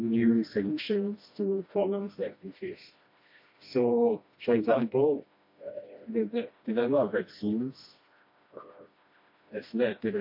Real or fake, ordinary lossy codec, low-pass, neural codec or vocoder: fake; MP3, 32 kbps; 5.4 kHz; codec, 16 kHz, 1 kbps, FreqCodec, smaller model